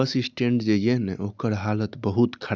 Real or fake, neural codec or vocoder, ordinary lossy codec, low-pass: real; none; none; none